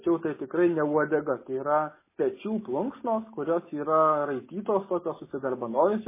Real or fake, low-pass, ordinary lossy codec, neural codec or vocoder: real; 3.6 kHz; MP3, 16 kbps; none